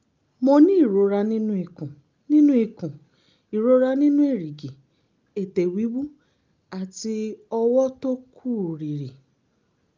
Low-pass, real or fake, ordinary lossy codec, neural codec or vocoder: 7.2 kHz; real; Opus, 24 kbps; none